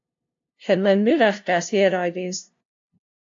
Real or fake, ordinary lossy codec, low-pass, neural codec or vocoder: fake; AAC, 64 kbps; 7.2 kHz; codec, 16 kHz, 0.5 kbps, FunCodec, trained on LibriTTS, 25 frames a second